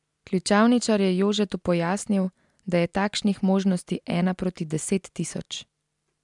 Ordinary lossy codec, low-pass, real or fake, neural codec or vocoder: MP3, 96 kbps; 10.8 kHz; real; none